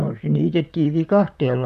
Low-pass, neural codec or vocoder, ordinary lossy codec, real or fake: 14.4 kHz; vocoder, 44.1 kHz, 128 mel bands, Pupu-Vocoder; none; fake